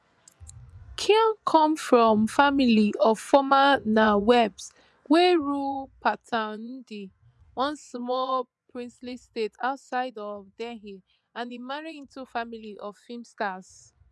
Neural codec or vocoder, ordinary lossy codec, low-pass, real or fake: vocoder, 24 kHz, 100 mel bands, Vocos; none; none; fake